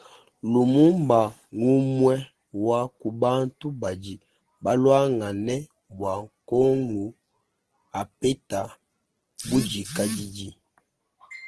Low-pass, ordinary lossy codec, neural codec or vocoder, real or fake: 10.8 kHz; Opus, 16 kbps; none; real